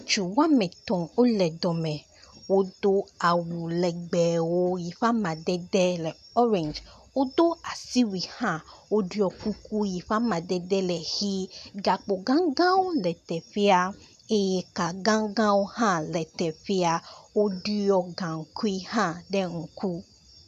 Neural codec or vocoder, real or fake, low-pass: none; real; 14.4 kHz